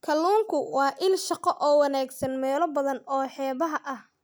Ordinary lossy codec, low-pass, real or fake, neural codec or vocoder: none; none; real; none